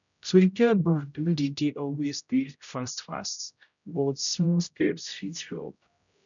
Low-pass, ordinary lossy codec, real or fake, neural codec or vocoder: 7.2 kHz; none; fake; codec, 16 kHz, 0.5 kbps, X-Codec, HuBERT features, trained on general audio